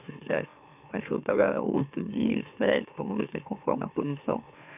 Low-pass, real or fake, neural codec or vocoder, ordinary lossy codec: 3.6 kHz; fake; autoencoder, 44.1 kHz, a latent of 192 numbers a frame, MeloTTS; none